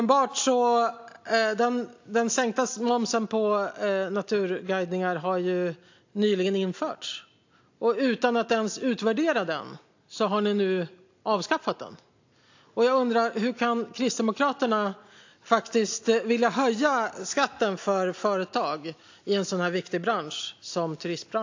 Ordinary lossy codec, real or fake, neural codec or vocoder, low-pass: AAC, 48 kbps; real; none; 7.2 kHz